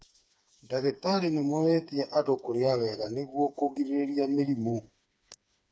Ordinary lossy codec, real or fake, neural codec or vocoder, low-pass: none; fake; codec, 16 kHz, 4 kbps, FreqCodec, smaller model; none